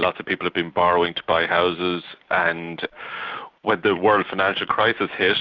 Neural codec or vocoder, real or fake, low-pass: none; real; 7.2 kHz